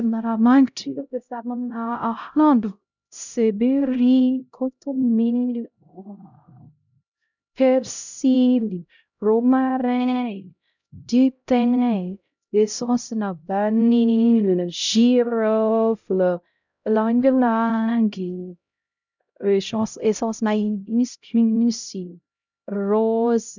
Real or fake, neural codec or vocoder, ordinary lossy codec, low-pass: fake; codec, 16 kHz, 0.5 kbps, X-Codec, HuBERT features, trained on LibriSpeech; none; 7.2 kHz